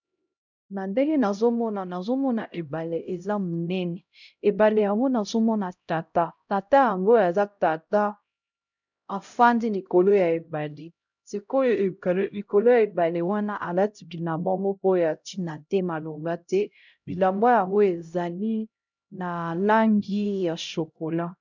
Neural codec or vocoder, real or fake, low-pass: codec, 16 kHz, 0.5 kbps, X-Codec, HuBERT features, trained on LibriSpeech; fake; 7.2 kHz